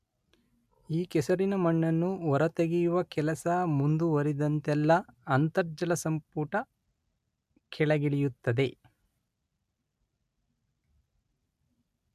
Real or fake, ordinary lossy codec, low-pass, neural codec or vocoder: real; MP3, 96 kbps; 14.4 kHz; none